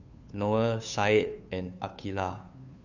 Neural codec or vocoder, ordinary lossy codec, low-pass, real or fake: codec, 16 kHz, 2 kbps, FunCodec, trained on Chinese and English, 25 frames a second; none; 7.2 kHz; fake